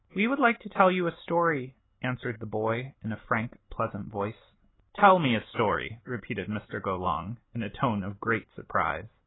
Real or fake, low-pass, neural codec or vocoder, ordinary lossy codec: real; 7.2 kHz; none; AAC, 16 kbps